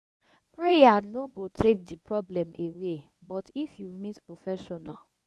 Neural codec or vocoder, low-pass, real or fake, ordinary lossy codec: codec, 24 kHz, 0.9 kbps, WavTokenizer, medium speech release version 1; none; fake; none